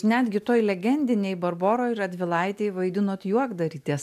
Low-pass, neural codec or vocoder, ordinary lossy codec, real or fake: 14.4 kHz; none; AAC, 96 kbps; real